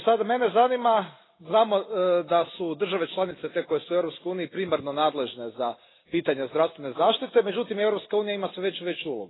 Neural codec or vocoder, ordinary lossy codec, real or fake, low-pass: none; AAC, 16 kbps; real; 7.2 kHz